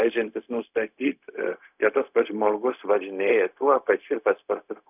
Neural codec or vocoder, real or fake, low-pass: codec, 16 kHz, 0.4 kbps, LongCat-Audio-Codec; fake; 3.6 kHz